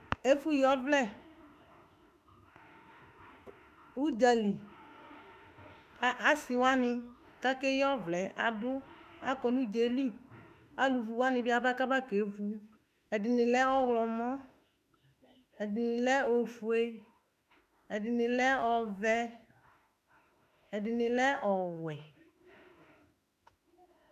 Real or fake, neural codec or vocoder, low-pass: fake; autoencoder, 48 kHz, 32 numbers a frame, DAC-VAE, trained on Japanese speech; 14.4 kHz